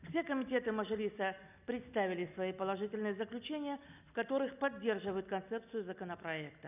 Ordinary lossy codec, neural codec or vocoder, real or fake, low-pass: none; none; real; 3.6 kHz